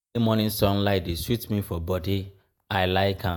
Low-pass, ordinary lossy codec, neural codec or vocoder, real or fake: none; none; vocoder, 48 kHz, 128 mel bands, Vocos; fake